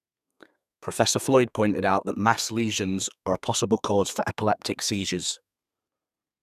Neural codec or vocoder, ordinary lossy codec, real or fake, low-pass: codec, 32 kHz, 1.9 kbps, SNAC; none; fake; 14.4 kHz